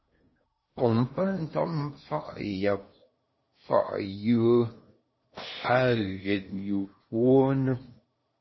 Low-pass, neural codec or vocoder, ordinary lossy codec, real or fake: 7.2 kHz; codec, 16 kHz in and 24 kHz out, 0.8 kbps, FocalCodec, streaming, 65536 codes; MP3, 24 kbps; fake